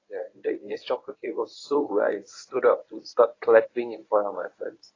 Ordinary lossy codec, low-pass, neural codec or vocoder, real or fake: AAC, 32 kbps; 7.2 kHz; codec, 24 kHz, 0.9 kbps, WavTokenizer, medium speech release version 1; fake